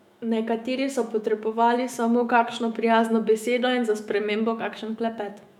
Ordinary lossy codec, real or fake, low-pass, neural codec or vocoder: none; fake; 19.8 kHz; autoencoder, 48 kHz, 128 numbers a frame, DAC-VAE, trained on Japanese speech